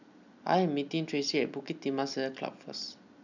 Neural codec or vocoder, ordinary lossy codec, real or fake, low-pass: none; none; real; 7.2 kHz